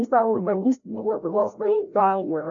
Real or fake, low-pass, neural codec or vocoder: fake; 7.2 kHz; codec, 16 kHz, 0.5 kbps, FreqCodec, larger model